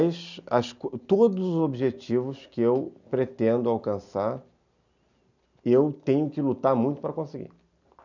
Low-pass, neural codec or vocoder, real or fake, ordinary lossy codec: 7.2 kHz; none; real; none